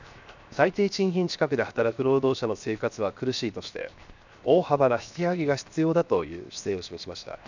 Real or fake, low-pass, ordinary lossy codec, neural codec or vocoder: fake; 7.2 kHz; none; codec, 16 kHz, 0.7 kbps, FocalCodec